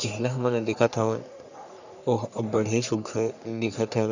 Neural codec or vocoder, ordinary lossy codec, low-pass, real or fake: codec, 44.1 kHz, 3.4 kbps, Pupu-Codec; none; 7.2 kHz; fake